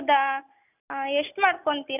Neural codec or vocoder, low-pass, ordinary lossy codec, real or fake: none; 3.6 kHz; none; real